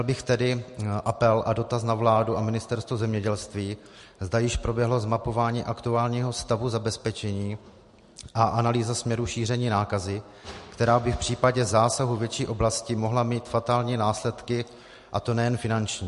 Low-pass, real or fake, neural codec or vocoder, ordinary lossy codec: 14.4 kHz; real; none; MP3, 48 kbps